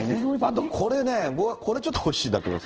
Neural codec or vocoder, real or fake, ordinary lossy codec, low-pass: codec, 16 kHz in and 24 kHz out, 1 kbps, XY-Tokenizer; fake; Opus, 16 kbps; 7.2 kHz